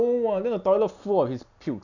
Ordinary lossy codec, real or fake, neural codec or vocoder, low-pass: none; real; none; 7.2 kHz